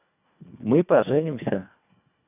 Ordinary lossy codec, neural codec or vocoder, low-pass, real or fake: none; codec, 24 kHz, 1.5 kbps, HILCodec; 3.6 kHz; fake